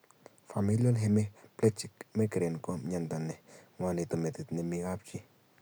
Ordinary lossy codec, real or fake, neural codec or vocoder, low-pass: none; real; none; none